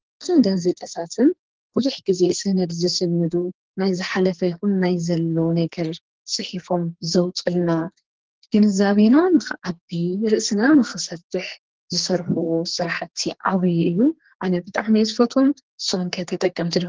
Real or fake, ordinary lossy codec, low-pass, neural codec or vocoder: fake; Opus, 16 kbps; 7.2 kHz; codec, 44.1 kHz, 2.6 kbps, SNAC